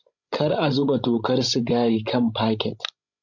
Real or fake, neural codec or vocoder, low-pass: fake; codec, 16 kHz, 16 kbps, FreqCodec, larger model; 7.2 kHz